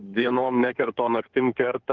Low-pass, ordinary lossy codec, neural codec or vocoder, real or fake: 7.2 kHz; Opus, 16 kbps; codec, 16 kHz in and 24 kHz out, 2.2 kbps, FireRedTTS-2 codec; fake